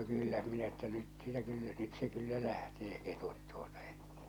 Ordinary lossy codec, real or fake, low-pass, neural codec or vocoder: none; real; none; none